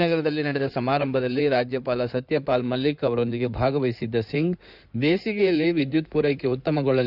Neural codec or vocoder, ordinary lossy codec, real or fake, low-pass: codec, 16 kHz in and 24 kHz out, 2.2 kbps, FireRedTTS-2 codec; none; fake; 5.4 kHz